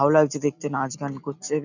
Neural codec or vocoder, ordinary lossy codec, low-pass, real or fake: none; none; 7.2 kHz; real